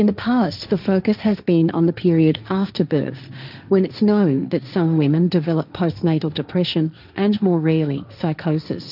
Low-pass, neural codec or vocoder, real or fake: 5.4 kHz; codec, 16 kHz, 1.1 kbps, Voila-Tokenizer; fake